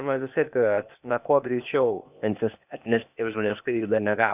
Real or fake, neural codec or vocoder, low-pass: fake; codec, 16 kHz, 0.8 kbps, ZipCodec; 3.6 kHz